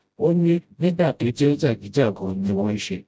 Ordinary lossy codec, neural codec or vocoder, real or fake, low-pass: none; codec, 16 kHz, 0.5 kbps, FreqCodec, smaller model; fake; none